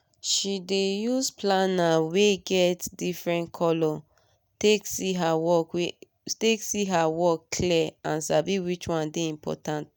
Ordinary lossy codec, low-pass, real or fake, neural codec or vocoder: none; none; real; none